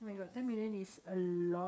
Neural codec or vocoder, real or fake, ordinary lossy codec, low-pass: codec, 16 kHz, 8 kbps, FreqCodec, smaller model; fake; none; none